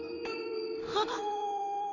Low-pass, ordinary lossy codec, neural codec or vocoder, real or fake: 7.2 kHz; none; codec, 16 kHz in and 24 kHz out, 1 kbps, XY-Tokenizer; fake